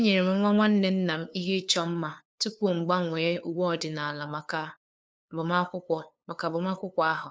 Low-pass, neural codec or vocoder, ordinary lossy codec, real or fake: none; codec, 16 kHz, 2 kbps, FunCodec, trained on LibriTTS, 25 frames a second; none; fake